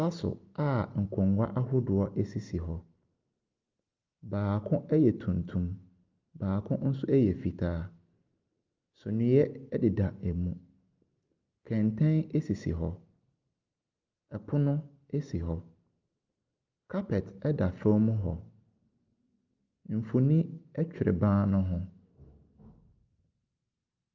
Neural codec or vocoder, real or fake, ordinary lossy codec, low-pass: none; real; Opus, 24 kbps; 7.2 kHz